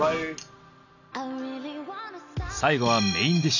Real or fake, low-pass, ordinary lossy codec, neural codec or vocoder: real; 7.2 kHz; none; none